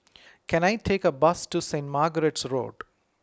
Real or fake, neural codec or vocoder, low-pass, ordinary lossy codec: real; none; none; none